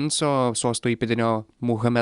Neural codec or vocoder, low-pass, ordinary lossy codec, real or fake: none; 10.8 kHz; Opus, 64 kbps; real